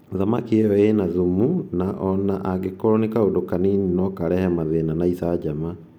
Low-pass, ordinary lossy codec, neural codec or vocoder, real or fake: 19.8 kHz; none; none; real